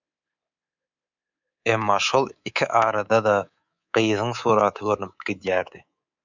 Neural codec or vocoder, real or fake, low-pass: codec, 24 kHz, 3.1 kbps, DualCodec; fake; 7.2 kHz